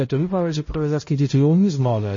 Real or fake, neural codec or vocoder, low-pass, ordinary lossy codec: fake; codec, 16 kHz, 0.5 kbps, X-Codec, HuBERT features, trained on balanced general audio; 7.2 kHz; MP3, 32 kbps